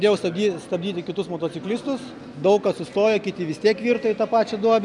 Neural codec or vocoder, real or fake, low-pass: none; real; 10.8 kHz